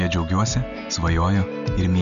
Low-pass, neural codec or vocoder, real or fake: 7.2 kHz; none; real